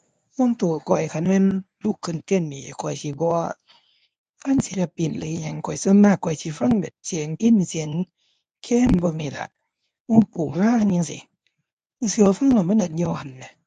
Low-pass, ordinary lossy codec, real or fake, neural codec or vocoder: 10.8 kHz; none; fake; codec, 24 kHz, 0.9 kbps, WavTokenizer, medium speech release version 1